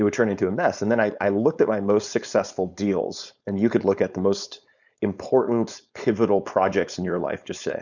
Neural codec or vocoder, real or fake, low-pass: codec, 16 kHz, 4.8 kbps, FACodec; fake; 7.2 kHz